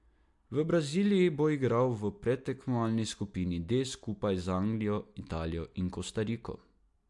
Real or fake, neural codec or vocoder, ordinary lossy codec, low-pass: real; none; MP3, 64 kbps; 10.8 kHz